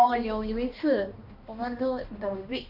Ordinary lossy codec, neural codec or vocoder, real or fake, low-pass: none; codec, 16 kHz, 1 kbps, X-Codec, HuBERT features, trained on balanced general audio; fake; 5.4 kHz